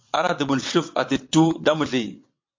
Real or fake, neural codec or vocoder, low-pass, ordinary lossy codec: fake; vocoder, 22.05 kHz, 80 mel bands, WaveNeXt; 7.2 kHz; MP3, 48 kbps